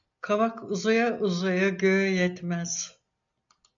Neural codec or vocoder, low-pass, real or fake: none; 7.2 kHz; real